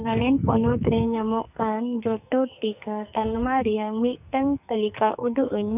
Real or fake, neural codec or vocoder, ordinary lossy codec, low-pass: fake; codec, 44.1 kHz, 2.6 kbps, SNAC; none; 3.6 kHz